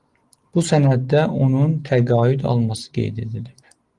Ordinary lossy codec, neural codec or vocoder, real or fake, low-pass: Opus, 24 kbps; none; real; 10.8 kHz